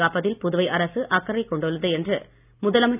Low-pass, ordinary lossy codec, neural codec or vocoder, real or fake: 3.6 kHz; none; none; real